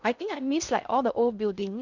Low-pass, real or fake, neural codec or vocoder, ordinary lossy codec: 7.2 kHz; fake; codec, 16 kHz in and 24 kHz out, 0.6 kbps, FocalCodec, streaming, 2048 codes; Opus, 64 kbps